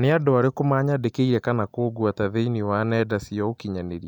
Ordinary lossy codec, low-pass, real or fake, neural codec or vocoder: none; 19.8 kHz; real; none